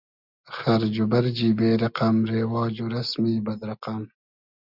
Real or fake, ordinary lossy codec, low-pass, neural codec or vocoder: real; Opus, 64 kbps; 5.4 kHz; none